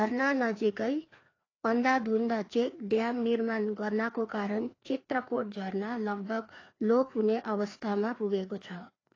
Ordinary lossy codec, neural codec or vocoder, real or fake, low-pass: AAC, 32 kbps; codec, 16 kHz, 2 kbps, FreqCodec, larger model; fake; 7.2 kHz